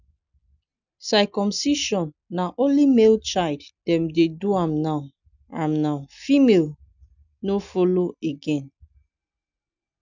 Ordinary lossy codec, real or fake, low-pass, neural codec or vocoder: none; real; 7.2 kHz; none